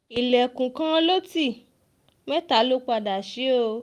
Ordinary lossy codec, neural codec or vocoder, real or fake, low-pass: Opus, 32 kbps; none; real; 14.4 kHz